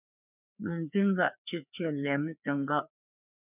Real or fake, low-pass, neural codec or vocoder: fake; 3.6 kHz; codec, 16 kHz, 2 kbps, FreqCodec, larger model